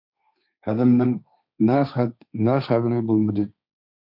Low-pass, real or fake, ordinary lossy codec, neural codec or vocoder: 5.4 kHz; fake; MP3, 48 kbps; codec, 16 kHz, 1.1 kbps, Voila-Tokenizer